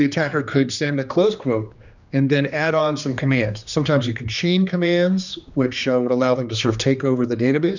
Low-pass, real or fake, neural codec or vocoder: 7.2 kHz; fake; codec, 16 kHz, 2 kbps, X-Codec, HuBERT features, trained on general audio